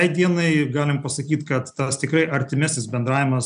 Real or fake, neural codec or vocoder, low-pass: real; none; 9.9 kHz